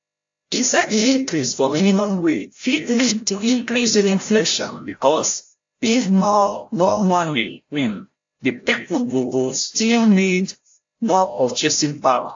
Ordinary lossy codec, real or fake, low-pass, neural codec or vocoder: AAC, 48 kbps; fake; 7.2 kHz; codec, 16 kHz, 0.5 kbps, FreqCodec, larger model